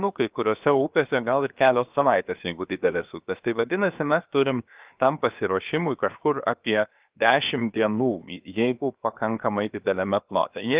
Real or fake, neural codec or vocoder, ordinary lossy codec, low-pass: fake; codec, 16 kHz, 0.7 kbps, FocalCodec; Opus, 32 kbps; 3.6 kHz